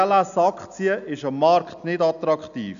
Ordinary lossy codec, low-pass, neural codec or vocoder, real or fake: none; 7.2 kHz; none; real